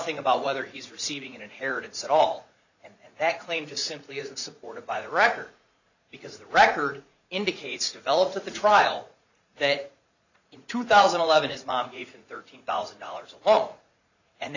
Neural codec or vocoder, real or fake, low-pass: vocoder, 44.1 kHz, 80 mel bands, Vocos; fake; 7.2 kHz